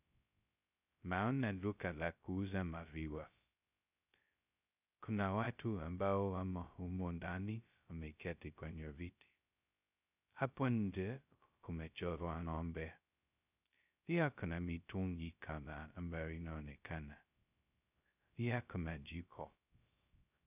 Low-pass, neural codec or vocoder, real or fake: 3.6 kHz; codec, 16 kHz, 0.2 kbps, FocalCodec; fake